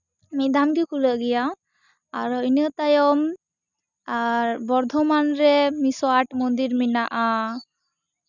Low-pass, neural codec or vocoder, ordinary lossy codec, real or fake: 7.2 kHz; none; none; real